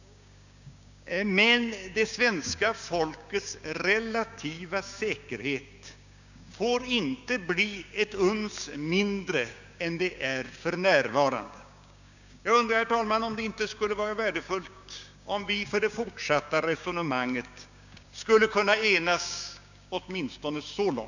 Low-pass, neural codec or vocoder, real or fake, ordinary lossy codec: 7.2 kHz; codec, 16 kHz, 6 kbps, DAC; fake; none